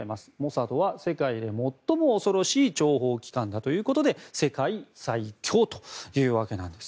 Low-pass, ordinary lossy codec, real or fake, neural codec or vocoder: none; none; real; none